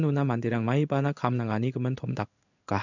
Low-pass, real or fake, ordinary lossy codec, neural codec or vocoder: 7.2 kHz; fake; none; codec, 16 kHz in and 24 kHz out, 1 kbps, XY-Tokenizer